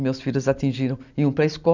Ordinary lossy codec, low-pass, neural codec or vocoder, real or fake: none; 7.2 kHz; none; real